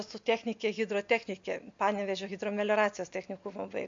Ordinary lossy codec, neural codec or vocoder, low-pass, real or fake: MP3, 48 kbps; none; 7.2 kHz; real